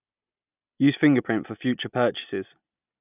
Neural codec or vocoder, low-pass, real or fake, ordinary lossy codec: none; 3.6 kHz; real; none